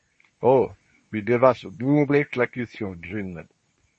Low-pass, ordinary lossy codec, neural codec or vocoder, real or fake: 10.8 kHz; MP3, 32 kbps; codec, 24 kHz, 0.9 kbps, WavTokenizer, medium speech release version 2; fake